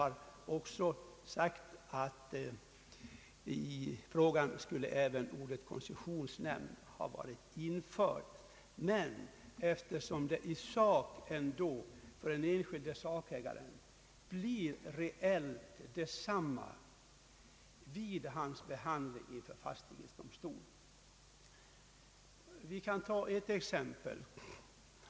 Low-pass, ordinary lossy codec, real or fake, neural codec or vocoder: none; none; real; none